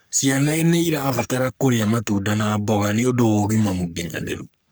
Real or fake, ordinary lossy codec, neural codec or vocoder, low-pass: fake; none; codec, 44.1 kHz, 3.4 kbps, Pupu-Codec; none